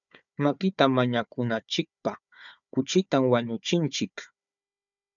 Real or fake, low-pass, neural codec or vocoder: fake; 7.2 kHz; codec, 16 kHz, 4 kbps, FunCodec, trained on Chinese and English, 50 frames a second